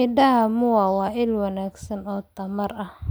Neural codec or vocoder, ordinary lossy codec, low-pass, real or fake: none; none; none; real